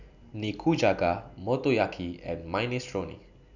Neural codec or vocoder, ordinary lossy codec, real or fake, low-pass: none; none; real; 7.2 kHz